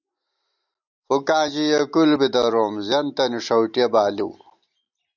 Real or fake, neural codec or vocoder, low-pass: real; none; 7.2 kHz